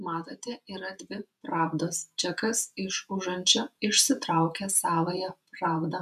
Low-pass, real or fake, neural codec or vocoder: 14.4 kHz; fake; vocoder, 48 kHz, 128 mel bands, Vocos